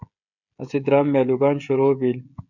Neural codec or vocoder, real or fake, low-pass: codec, 16 kHz, 16 kbps, FreqCodec, smaller model; fake; 7.2 kHz